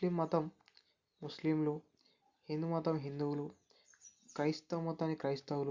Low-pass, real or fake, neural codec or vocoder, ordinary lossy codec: 7.2 kHz; real; none; Opus, 64 kbps